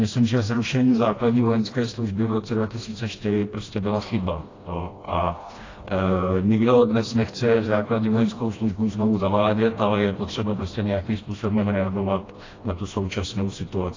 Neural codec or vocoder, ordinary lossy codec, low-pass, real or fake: codec, 16 kHz, 1 kbps, FreqCodec, smaller model; AAC, 32 kbps; 7.2 kHz; fake